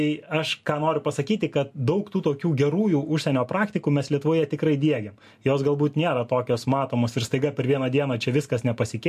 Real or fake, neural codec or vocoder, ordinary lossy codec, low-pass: real; none; MP3, 64 kbps; 14.4 kHz